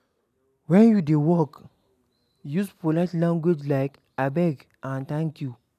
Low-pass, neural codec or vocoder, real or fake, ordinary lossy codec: 14.4 kHz; none; real; none